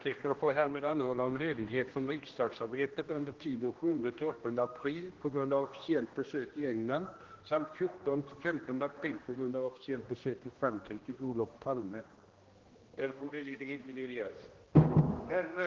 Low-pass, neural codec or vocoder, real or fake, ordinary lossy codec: 7.2 kHz; codec, 16 kHz, 1 kbps, X-Codec, HuBERT features, trained on general audio; fake; Opus, 16 kbps